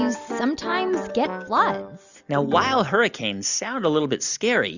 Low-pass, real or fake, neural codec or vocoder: 7.2 kHz; real; none